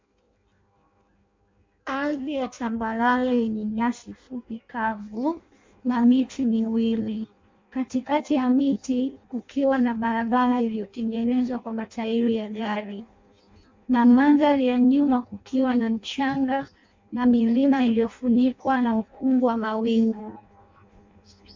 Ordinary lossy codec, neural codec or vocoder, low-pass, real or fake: MP3, 48 kbps; codec, 16 kHz in and 24 kHz out, 0.6 kbps, FireRedTTS-2 codec; 7.2 kHz; fake